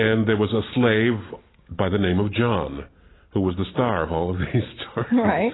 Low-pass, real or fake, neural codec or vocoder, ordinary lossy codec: 7.2 kHz; real; none; AAC, 16 kbps